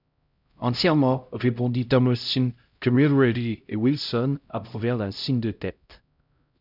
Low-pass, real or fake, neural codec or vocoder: 5.4 kHz; fake; codec, 16 kHz, 0.5 kbps, X-Codec, HuBERT features, trained on LibriSpeech